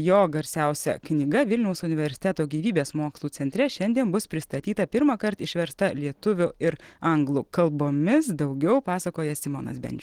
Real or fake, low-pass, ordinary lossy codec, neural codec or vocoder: real; 19.8 kHz; Opus, 16 kbps; none